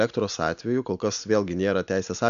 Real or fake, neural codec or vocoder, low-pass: real; none; 7.2 kHz